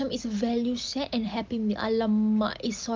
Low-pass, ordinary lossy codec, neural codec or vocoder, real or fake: 7.2 kHz; Opus, 24 kbps; none; real